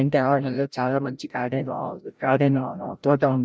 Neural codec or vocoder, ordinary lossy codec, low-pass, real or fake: codec, 16 kHz, 0.5 kbps, FreqCodec, larger model; none; none; fake